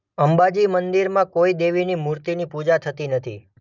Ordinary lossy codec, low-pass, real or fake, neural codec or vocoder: none; 7.2 kHz; real; none